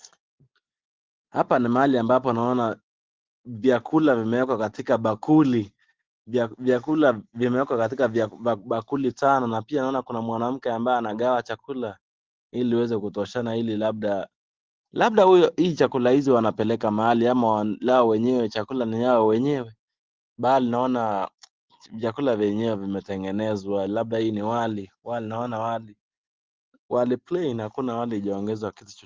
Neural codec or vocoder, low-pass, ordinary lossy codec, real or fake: none; 7.2 kHz; Opus, 16 kbps; real